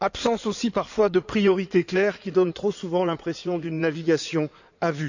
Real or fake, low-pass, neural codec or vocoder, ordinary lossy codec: fake; 7.2 kHz; codec, 16 kHz in and 24 kHz out, 2.2 kbps, FireRedTTS-2 codec; none